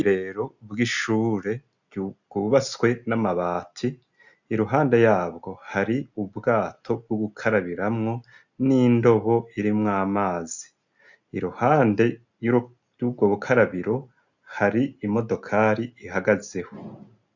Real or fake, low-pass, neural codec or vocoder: real; 7.2 kHz; none